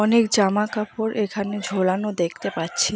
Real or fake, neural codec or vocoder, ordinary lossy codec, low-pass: real; none; none; none